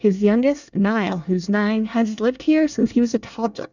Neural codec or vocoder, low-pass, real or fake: codec, 24 kHz, 1 kbps, SNAC; 7.2 kHz; fake